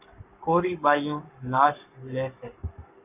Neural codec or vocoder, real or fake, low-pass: codec, 44.1 kHz, 7.8 kbps, Pupu-Codec; fake; 3.6 kHz